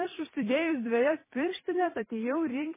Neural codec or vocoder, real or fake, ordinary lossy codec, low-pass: vocoder, 44.1 kHz, 128 mel bands every 256 samples, BigVGAN v2; fake; MP3, 16 kbps; 3.6 kHz